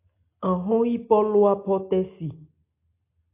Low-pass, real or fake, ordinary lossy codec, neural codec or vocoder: 3.6 kHz; real; AAC, 24 kbps; none